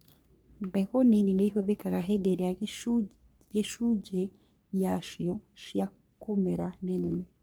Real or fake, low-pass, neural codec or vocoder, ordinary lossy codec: fake; none; codec, 44.1 kHz, 3.4 kbps, Pupu-Codec; none